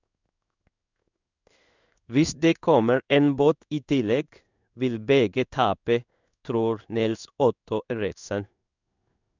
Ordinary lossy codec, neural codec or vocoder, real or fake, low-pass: none; codec, 16 kHz in and 24 kHz out, 1 kbps, XY-Tokenizer; fake; 7.2 kHz